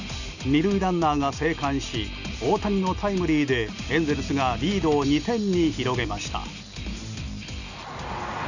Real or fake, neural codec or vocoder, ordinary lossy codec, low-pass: real; none; none; 7.2 kHz